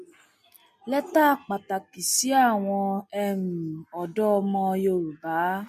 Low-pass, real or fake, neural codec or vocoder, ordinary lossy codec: 14.4 kHz; real; none; MP3, 64 kbps